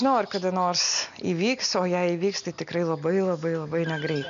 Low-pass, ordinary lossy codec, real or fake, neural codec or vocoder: 7.2 kHz; MP3, 96 kbps; real; none